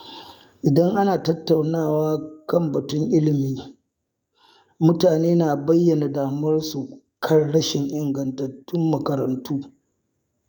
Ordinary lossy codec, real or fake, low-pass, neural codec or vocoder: none; fake; 19.8 kHz; codec, 44.1 kHz, 7.8 kbps, DAC